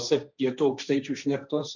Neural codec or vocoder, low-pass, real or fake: codec, 16 kHz, 1.1 kbps, Voila-Tokenizer; 7.2 kHz; fake